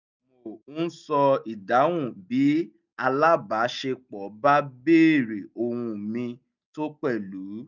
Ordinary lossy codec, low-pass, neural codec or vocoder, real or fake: none; 7.2 kHz; none; real